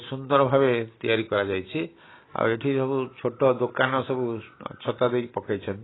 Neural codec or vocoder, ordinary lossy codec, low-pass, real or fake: none; AAC, 16 kbps; 7.2 kHz; real